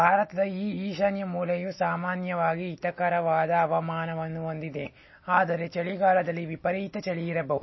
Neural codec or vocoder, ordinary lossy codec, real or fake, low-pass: none; MP3, 24 kbps; real; 7.2 kHz